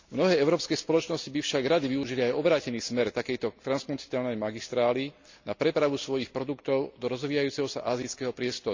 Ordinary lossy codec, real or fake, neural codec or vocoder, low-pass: MP3, 64 kbps; real; none; 7.2 kHz